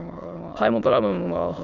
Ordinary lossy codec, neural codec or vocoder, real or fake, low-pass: none; autoencoder, 22.05 kHz, a latent of 192 numbers a frame, VITS, trained on many speakers; fake; 7.2 kHz